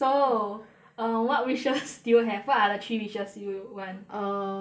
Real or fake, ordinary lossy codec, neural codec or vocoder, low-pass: real; none; none; none